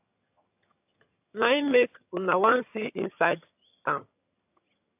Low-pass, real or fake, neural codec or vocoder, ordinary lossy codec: 3.6 kHz; fake; vocoder, 22.05 kHz, 80 mel bands, HiFi-GAN; AAC, 32 kbps